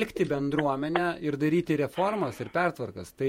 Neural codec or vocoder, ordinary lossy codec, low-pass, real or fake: vocoder, 48 kHz, 128 mel bands, Vocos; MP3, 64 kbps; 19.8 kHz; fake